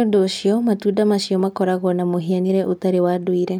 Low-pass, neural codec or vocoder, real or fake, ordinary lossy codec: 19.8 kHz; none; real; none